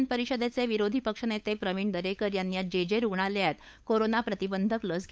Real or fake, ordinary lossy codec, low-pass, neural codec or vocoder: fake; none; none; codec, 16 kHz, 8 kbps, FunCodec, trained on LibriTTS, 25 frames a second